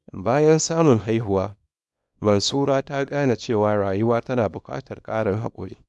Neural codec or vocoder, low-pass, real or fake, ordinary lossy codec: codec, 24 kHz, 0.9 kbps, WavTokenizer, small release; none; fake; none